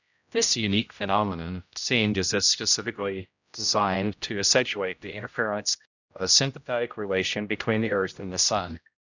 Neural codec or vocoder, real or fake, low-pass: codec, 16 kHz, 0.5 kbps, X-Codec, HuBERT features, trained on general audio; fake; 7.2 kHz